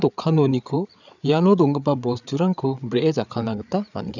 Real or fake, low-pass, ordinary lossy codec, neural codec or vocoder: fake; 7.2 kHz; none; codec, 16 kHz, 8 kbps, FreqCodec, larger model